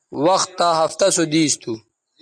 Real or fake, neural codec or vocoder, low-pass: real; none; 9.9 kHz